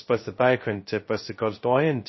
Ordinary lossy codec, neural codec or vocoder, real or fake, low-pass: MP3, 24 kbps; codec, 16 kHz, 0.2 kbps, FocalCodec; fake; 7.2 kHz